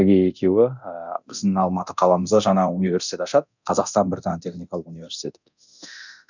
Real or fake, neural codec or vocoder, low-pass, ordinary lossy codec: fake; codec, 24 kHz, 0.9 kbps, DualCodec; 7.2 kHz; none